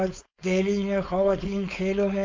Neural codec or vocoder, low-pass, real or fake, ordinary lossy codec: codec, 16 kHz, 4.8 kbps, FACodec; 7.2 kHz; fake; MP3, 64 kbps